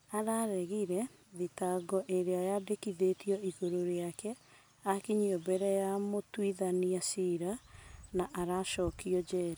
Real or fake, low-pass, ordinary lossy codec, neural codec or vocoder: real; none; none; none